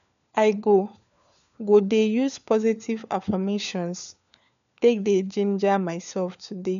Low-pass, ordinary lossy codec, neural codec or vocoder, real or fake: 7.2 kHz; none; codec, 16 kHz, 4 kbps, FunCodec, trained on LibriTTS, 50 frames a second; fake